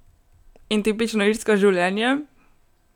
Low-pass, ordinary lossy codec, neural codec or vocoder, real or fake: 19.8 kHz; none; none; real